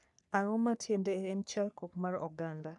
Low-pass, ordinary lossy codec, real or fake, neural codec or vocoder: 10.8 kHz; none; fake; codec, 44.1 kHz, 3.4 kbps, Pupu-Codec